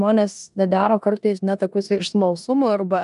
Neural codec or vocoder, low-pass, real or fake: codec, 16 kHz in and 24 kHz out, 0.9 kbps, LongCat-Audio-Codec, four codebook decoder; 10.8 kHz; fake